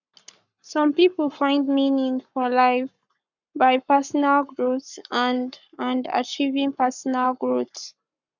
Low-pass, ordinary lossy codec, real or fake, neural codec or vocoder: 7.2 kHz; none; fake; codec, 44.1 kHz, 7.8 kbps, Pupu-Codec